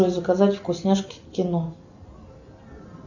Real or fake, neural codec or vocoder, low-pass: real; none; 7.2 kHz